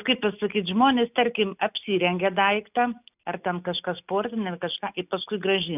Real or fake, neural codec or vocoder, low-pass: real; none; 3.6 kHz